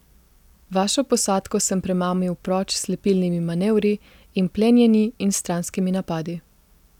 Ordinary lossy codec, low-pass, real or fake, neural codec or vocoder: none; 19.8 kHz; real; none